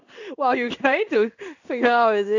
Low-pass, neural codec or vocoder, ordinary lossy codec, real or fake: 7.2 kHz; codec, 16 kHz in and 24 kHz out, 1 kbps, XY-Tokenizer; none; fake